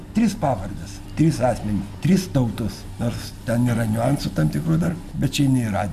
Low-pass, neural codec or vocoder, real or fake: 14.4 kHz; none; real